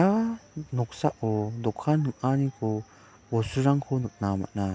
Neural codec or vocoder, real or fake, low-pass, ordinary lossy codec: none; real; none; none